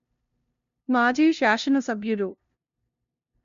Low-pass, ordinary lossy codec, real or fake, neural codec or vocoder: 7.2 kHz; MP3, 64 kbps; fake; codec, 16 kHz, 0.5 kbps, FunCodec, trained on LibriTTS, 25 frames a second